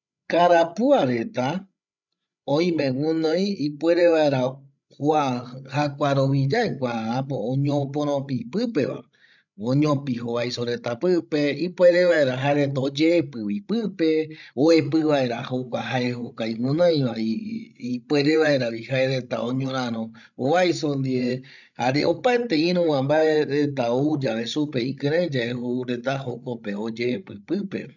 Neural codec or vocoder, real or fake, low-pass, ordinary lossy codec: codec, 16 kHz, 16 kbps, FreqCodec, larger model; fake; 7.2 kHz; none